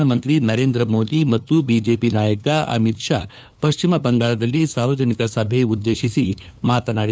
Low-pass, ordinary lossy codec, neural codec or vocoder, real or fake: none; none; codec, 16 kHz, 2 kbps, FunCodec, trained on LibriTTS, 25 frames a second; fake